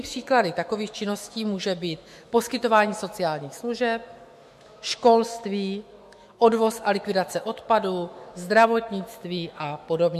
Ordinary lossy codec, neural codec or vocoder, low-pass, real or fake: MP3, 64 kbps; autoencoder, 48 kHz, 128 numbers a frame, DAC-VAE, trained on Japanese speech; 14.4 kHz; fake